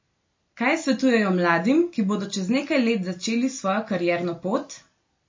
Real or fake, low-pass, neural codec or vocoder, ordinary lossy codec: real; 7.2 kHz; none; MP3, 32 kbps